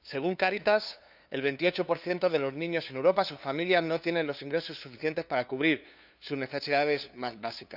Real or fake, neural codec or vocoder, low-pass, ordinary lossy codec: fake; codec, 16 kHz, 2 kbps, FunCodec, trained on LibriTTS, 25 frames a second; 5.4 kHz; none